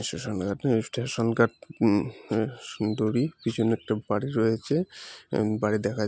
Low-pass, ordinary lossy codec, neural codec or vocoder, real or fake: none; none; none; real